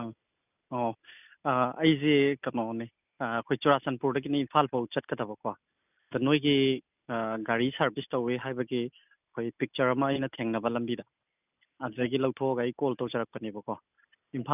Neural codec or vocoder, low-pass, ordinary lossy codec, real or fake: none; 3.6 kHz; none; real